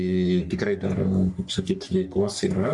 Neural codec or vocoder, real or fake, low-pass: codec, 44.1 kHz, 3.4 kbps, Pupu-Codec; fake; 10.8 kHz